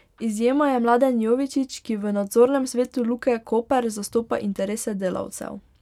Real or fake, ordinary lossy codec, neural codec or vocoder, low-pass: real; none; none; 19.8 kHz